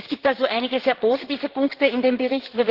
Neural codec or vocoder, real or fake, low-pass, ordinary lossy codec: codec, 16 kHz in and 24 kHz out, 2.2 kbps, FireRedTTS-2 codec; fake; 5.4 kHz; Opus, 16 kbps